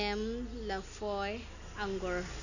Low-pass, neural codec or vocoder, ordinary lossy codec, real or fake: 7.2 kHz; none; none; real